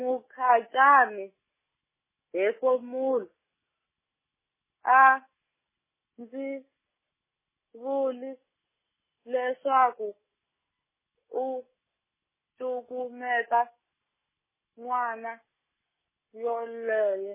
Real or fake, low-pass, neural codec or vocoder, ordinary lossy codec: real; 3.6 kHz; none; MP3, 16 kbps